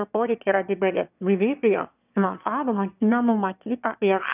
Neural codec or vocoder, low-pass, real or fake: autoencoder, 22.05 kHz, a latent of 192 numbers a frame, VITS, trained on one speaker; 3.6 kHz; fake